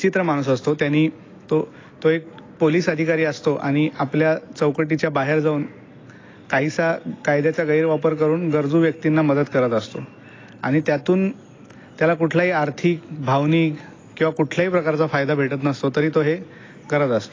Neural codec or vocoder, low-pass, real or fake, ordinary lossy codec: none; 7.2 kHz; real; AAC, 32 kbps